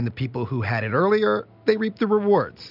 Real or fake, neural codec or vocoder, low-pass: real; none; 5.4 kHz